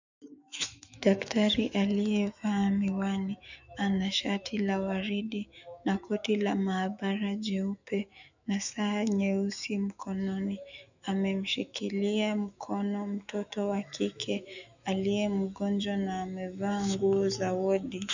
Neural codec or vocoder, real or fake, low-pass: autoencoder, 48 kHz, 128 numbers a frame, DAC-VAE, trained on Japanese speech; fake; 7.2 kHz